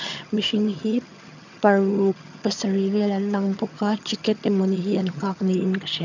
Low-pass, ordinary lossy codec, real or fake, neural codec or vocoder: 7.2 kHz; none; fake; vocoder, 22.05 kHz, 80 mel bands, HiFi-GAN